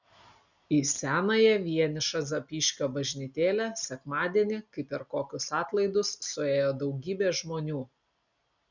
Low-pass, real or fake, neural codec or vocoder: 7.2 kHz; real; none